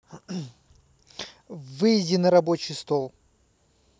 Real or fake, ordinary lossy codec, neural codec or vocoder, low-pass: real; none; none; none